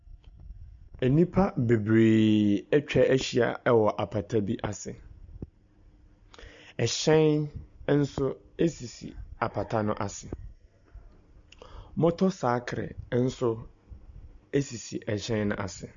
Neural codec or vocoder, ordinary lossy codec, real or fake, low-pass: none; MP3, 64 kbps; real; 7.2 kHz